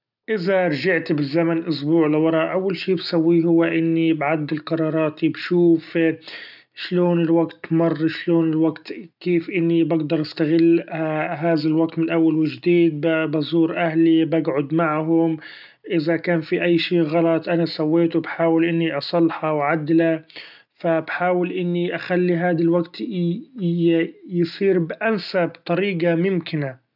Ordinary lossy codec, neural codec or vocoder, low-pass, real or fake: none; none; 5.4 kHz; real